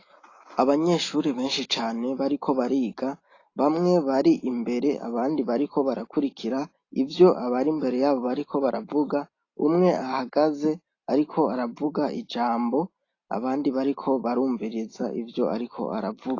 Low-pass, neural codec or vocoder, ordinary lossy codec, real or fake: 7.2 kHz; none; AAC, 32 kbps; real